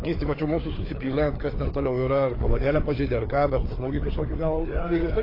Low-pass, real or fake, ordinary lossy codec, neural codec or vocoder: 5.4 kHz; fake; AAC, 24 kbps; codec, 16 kHz, 4 kbps, FreqCodec, larger model